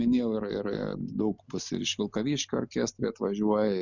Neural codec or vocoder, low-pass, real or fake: none; 7.2 kHz; real